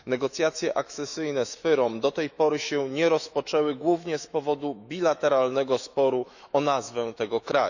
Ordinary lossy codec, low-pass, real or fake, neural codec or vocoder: none; 7.2 kHz; fake; autoencoder, 48 kHz, 128 numbers a frame, DAC-VAE, trained on Japanese speech